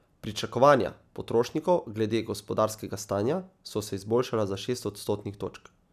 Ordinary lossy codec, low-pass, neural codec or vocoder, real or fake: none; 14.4 kHz; none; real